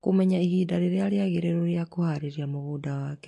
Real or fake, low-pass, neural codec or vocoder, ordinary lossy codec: real; 10.8 kHz; none; AAC, 48 kbps